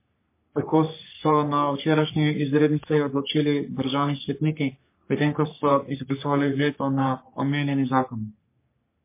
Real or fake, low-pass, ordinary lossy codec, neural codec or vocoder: fake; 3.6 kHz; MP3, 24 kbps; codec, 44.1 kHz, 3.4 kbps, Pupu-Codec